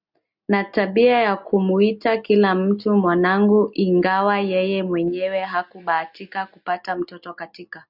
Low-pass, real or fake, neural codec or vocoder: 5.4 kHz; real; none